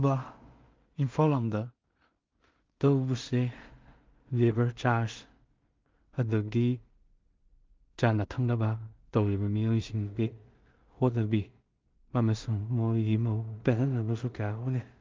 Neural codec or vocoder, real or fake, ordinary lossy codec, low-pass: codec, 16 kHz in and 24 kHz out, 0.4 kbps, LongCat-Audio-Codec, two codebook decoder; fake; Opus, 24 kbps; 7.2 kHz